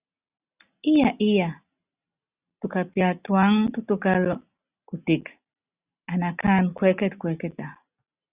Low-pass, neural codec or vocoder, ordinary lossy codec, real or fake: 3.6 kHz; none; Opus, 64 kbps; real